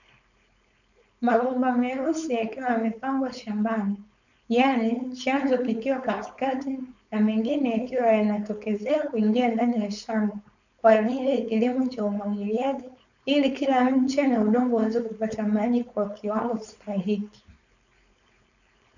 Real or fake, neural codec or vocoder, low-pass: fake; codec, 16 kHz, 4.8 kbps, FACodec; 7.2 kHz